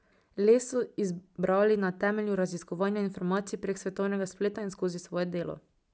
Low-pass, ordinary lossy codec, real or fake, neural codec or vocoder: none; none; real; none